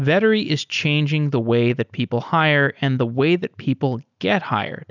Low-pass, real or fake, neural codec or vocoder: 7.2 kHz; real; none